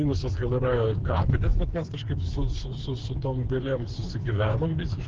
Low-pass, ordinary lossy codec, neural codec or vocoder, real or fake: 7.2 kHz; Opus, 16 kbps; codec, 16 kHz, 4 kbps, FreqCodec, smaller model; fake